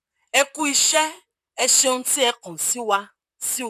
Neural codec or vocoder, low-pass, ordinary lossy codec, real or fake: vocoder, 44.1 kHz, 128 mel bands, Pupu-Vocoder; 14.4 kHz; none; fake